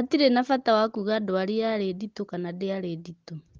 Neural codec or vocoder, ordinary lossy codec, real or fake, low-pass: none; Opus, 16 kbps; real; 7.2 kHz